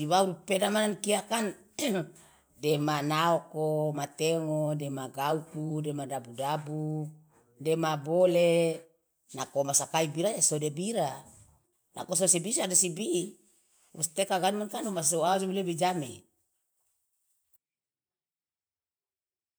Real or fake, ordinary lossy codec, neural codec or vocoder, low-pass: fake; none; vocoder, 44.1 kHz, 128 mel bands every 512 samples, BigVGAN v2; none